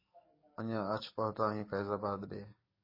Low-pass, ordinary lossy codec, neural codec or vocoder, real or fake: 5.4 kHz; MP3, 24 kbps; codec, 44.1 kHz, 7.8 kbps, DAC; fake